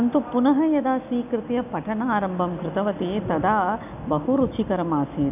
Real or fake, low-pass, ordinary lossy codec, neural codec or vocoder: real; 3.6 kHz; none; none